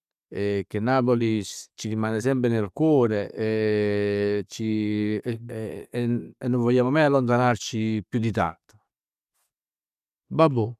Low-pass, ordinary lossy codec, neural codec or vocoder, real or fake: 14.4 kHz; none; none; real